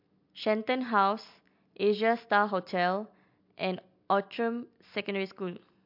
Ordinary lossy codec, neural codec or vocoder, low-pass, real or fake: MP3, 48 kbps; none; 5.4 kHz; real